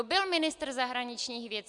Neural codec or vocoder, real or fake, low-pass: none; real; 10.8 kHz